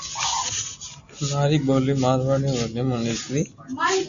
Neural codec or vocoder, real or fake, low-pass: none; real; 7.2 kHz